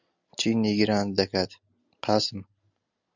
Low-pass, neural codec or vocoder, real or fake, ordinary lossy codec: 7.2 kHz; none; real; Opus, 64 kbps